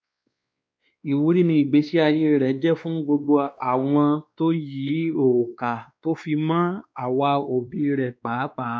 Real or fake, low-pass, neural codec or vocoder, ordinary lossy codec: fake; none; codec, 16 kHz, 2 kbps, X-Codec, WavLM features, trained on Multilingual LibriSpeech; none